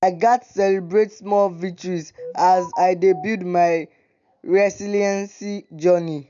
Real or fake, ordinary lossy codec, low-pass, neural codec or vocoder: real; none; 7.2 kHz; none